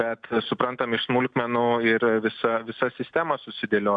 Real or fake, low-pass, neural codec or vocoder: real; 9.9 kHz; none